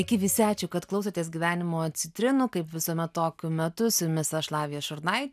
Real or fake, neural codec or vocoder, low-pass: real; none; 14.4 kHz